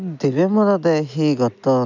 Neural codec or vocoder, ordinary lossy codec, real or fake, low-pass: none; none; real; 7.2 kHz